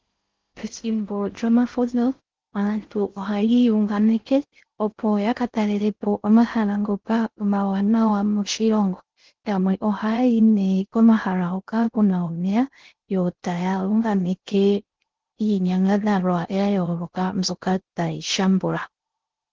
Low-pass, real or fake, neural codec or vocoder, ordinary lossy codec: 7.2 kHz; fake; codec, 16 kHz in and 24 kHz out, 0.6 kbps, FocalCodec, streaming, 4096 codes; Opus, 16 kbps